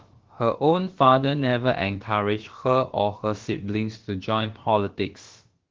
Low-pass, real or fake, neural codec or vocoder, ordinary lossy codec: 7.2 kHz; fake; codec, 16 kHz, about 1 kbps, DyCAST, with the encoder's durations; Opus, 16 kbps